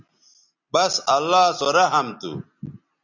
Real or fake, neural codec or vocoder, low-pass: real; none; 7.2 kHz